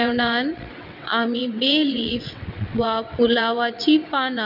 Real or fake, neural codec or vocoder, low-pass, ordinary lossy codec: fake; vocoder, 22.05 kHz, 80 mel bands, WaveNeXt; 5.4 kHz; none